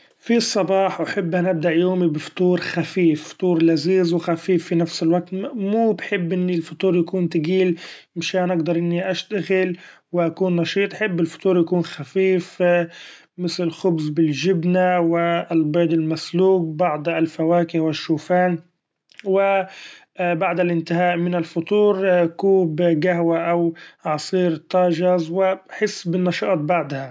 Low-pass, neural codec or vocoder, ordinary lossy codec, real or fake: none; none; none; real